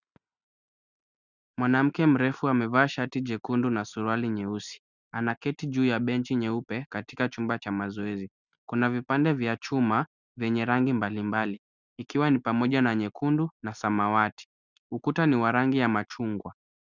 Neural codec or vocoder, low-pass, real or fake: none; 7.2 kHz; real